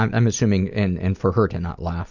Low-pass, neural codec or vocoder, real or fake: 7.2 kHz; none; real